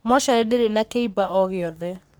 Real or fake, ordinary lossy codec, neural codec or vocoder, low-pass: fake; none; codec, 44.1 kHz, 3.4 kbps, Pupu-Codec; none